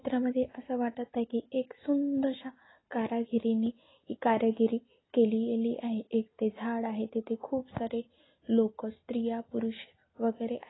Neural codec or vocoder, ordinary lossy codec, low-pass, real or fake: none; AAC, 16 kbps; 7.2 kHz; real